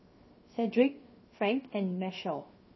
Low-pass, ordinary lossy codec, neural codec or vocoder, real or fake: 7.2 kHz; MP3, 24 kbps; codec, 16 kHz, 0.7 kbps, FocalCodec; fake